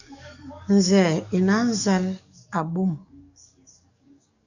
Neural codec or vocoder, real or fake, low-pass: codec, 44.1 kHz, 7.8 kbps, DAC; fake; 7.2 kHz